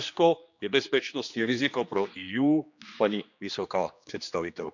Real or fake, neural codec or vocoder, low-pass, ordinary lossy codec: fake; codec, 16 kHz, 2 kbps, X-Codec, HuBERT features, trained on general audio; 7.2 kHz; none